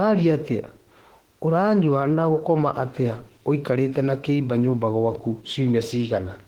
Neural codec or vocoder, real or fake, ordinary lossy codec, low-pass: autoencoder, 48 kHz, 32 numbers a frame, DAC-VAE, trained on Japanese speech; fake; Opus, 16 kbps; 19.8 kHz